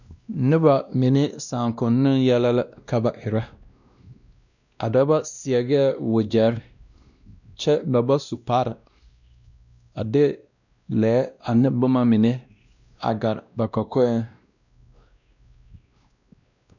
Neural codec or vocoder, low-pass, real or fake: codec, 16 kHz, 1 kbps, X-Codec, WavLM features, trained on Multilingual LibriSpeech; 7.2 kHz; fake